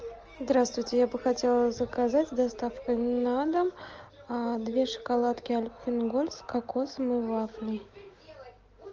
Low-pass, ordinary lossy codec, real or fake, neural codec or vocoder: 7.2 kHz; Opus, 24 kbps; real; none